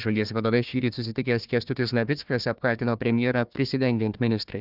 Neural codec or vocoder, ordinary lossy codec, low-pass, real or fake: codec, 16 kHz, 1 kbps, FunCodec, trained on Chinese and English, 50 frames a second; Opus, 24 kbps; 5.4 kHz; fake